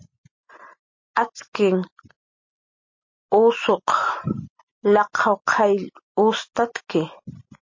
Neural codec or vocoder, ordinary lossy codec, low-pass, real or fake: none; MP3, 32 kbps; 7.2 kHz; real